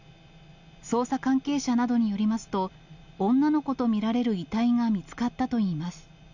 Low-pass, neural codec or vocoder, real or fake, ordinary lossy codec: 7.2 kHz; none; real; none